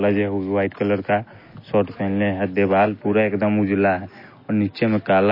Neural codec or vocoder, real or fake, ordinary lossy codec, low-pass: none; real; MP3, 24 kbps; 5.4 kHz